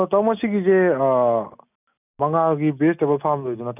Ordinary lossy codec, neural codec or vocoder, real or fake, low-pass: none; none; real; 3.6 kHz